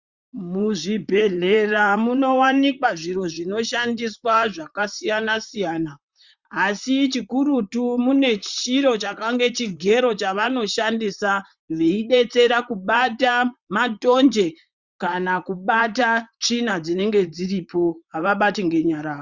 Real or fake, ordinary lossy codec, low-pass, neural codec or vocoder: fake; Opus, 64 kbps; 7.2 kHz; vocoder, 44.1 kHz, 128 mel bands, Pupu-Vocoder